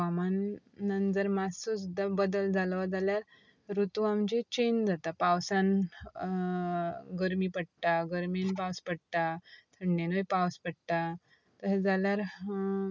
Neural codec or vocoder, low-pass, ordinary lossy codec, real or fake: none; 7.2 kHz; none; real